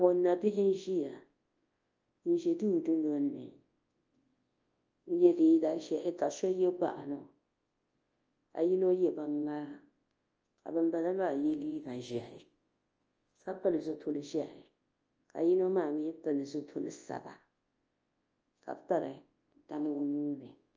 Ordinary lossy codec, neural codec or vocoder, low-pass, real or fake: Opus, 24 kbps; codec, 24 kHz, 0.9 kbps, WavTokenizer, large speech release; 7.2 kHz; fake